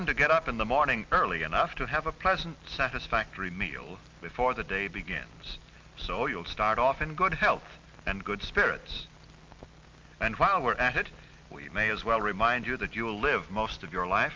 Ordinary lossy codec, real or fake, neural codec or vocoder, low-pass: Opus, 32 kbps; real; none; 7.2 kHz